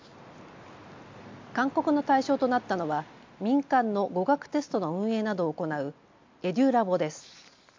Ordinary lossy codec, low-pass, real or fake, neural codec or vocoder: MP3, 48 kbps; 7.2 kHz; real; none